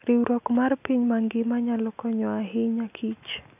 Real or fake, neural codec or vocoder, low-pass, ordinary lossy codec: real; none; 3.6 kHz; none